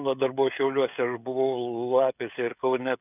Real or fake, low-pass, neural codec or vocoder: fake; 3.6 kHz; codec, 16 kHz, 16 kbps, FreqCodec, smaller model